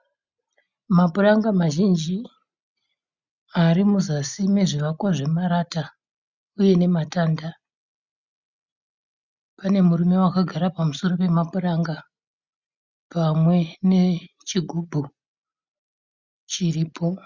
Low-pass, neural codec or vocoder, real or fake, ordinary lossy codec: 7.2 kHz; none; real; Opus, 64 kbps